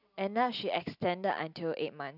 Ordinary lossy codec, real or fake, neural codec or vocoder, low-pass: none; real; none; 5.4 kHz